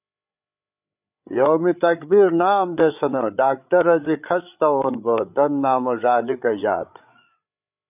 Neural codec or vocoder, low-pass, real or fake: codec, 16 kHz, 8 kbps, FreqCodec, larger model; 3.6 kHz; fake